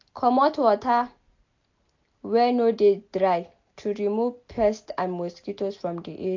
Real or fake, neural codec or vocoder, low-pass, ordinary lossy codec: real; none; 7.2 kHz; AAC, 48 kbps